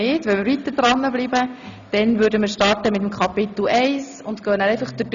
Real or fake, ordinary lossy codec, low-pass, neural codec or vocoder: real; none; 7.2 kHz; none